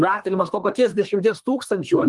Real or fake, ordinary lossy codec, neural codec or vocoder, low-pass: fake; Opus, 24 kbps; codec, 24 kHz, 1 kbps, SNAC; 10.8 kHz